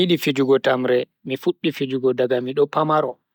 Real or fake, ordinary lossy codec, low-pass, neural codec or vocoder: real; none; 19.8 kHz; none